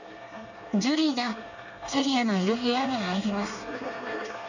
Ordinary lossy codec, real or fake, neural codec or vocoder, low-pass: none; fake; codec, 24 kHz, 1 kbps, SNAC; 7.2 kHz